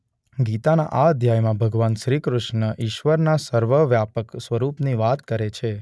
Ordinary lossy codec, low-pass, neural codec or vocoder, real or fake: none; none; none; real